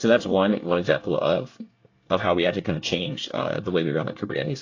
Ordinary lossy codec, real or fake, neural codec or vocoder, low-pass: AAC, 48 kbps; fake; codec, 24 kHz, 1 kbps, SNAC; 7.2 kHz